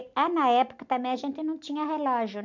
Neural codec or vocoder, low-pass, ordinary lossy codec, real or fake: none; 7.2 kHz; none; real